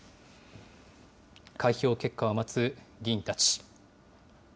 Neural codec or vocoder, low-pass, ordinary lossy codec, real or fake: none; none; none; real